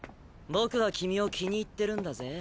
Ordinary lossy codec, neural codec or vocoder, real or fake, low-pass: none; none; real; none